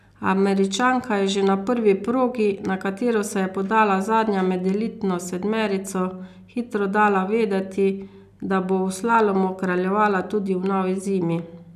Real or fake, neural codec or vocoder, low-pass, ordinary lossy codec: real; none; 14.4 kHz; none